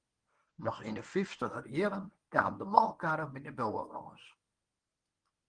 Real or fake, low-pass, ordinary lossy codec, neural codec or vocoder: fake; 9.9 kHz; Opus, 24 kbps; codec, 24 kHz, 0.9 kbps, WavTokenizer, medium speech release version 1